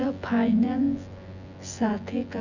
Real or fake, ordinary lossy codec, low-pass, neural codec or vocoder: fake; none; 7.2 kHz; vocoder, 24 kHz, 100 mel bands, Vocos